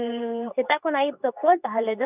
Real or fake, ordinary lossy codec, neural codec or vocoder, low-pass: fake; none; codec, 24 kHz, 0.9 kbps, WavTokenizer, medium speech release version 2; 3.6 kHz